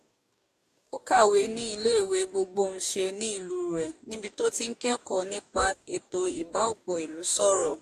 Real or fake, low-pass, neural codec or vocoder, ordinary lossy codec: fake; 14.4 kHz; codec, 44.1 kHz, 2.6 kbps, DAC; AAC, 64 kbps